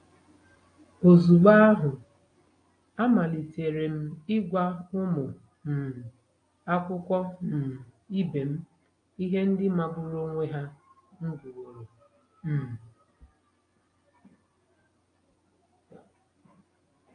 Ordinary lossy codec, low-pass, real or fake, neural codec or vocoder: AAC, 48 kbps; 9.9 kHz; real; none